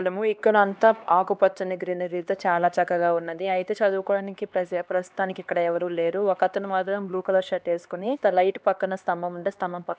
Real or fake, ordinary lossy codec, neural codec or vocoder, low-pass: fake; none; codec, 16 kHz, 2 kbps, X-Codec, HuBERT features, trained on LibriSpeech; none